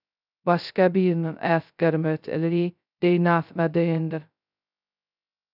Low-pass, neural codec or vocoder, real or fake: 5.4 kHz; codec, 16 kHz, 0.2 kbps, FocalCodec; fake